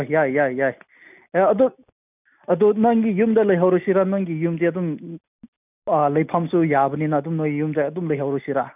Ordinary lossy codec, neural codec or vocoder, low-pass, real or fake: none; none; 3.6 kHz; real